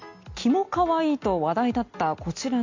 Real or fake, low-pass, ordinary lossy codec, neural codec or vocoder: real; 7.2 kHz; MP3, 48 kbps; none